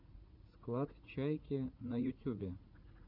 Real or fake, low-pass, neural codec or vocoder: fake; 5.4 kHz; vocoder, 44.1 kHz, 80 mel bands, Vocos